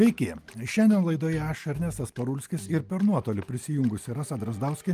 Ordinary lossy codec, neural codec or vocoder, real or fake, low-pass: Opus, 32 kbps; none; real; 14.4 kHz